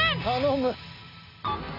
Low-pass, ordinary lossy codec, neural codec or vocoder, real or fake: 5.4 kHz; none; none; real